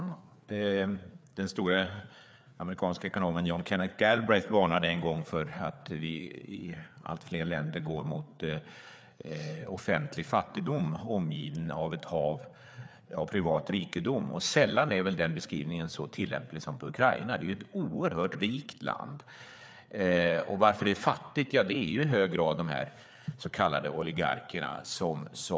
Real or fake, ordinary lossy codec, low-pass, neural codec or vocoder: fake; none; none; codec, 16 kHz, 4 kbps, FreqCodec, larger model